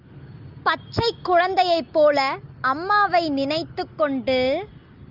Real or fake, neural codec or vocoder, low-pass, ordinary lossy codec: real; none; 5.4 kHz; Opus, 32 kbps